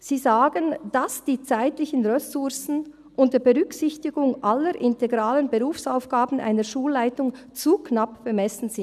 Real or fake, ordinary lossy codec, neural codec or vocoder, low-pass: real; none; none; 14.4 kHz